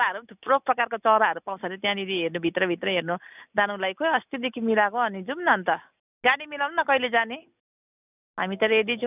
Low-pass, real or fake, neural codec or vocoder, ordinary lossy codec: 3.6 kHz; real; none; none